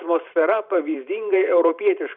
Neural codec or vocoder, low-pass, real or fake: vocoder, 44.1 kHz, 128 mel bands every 512 samples, BigVGAN v2; 5.4 kHz; fake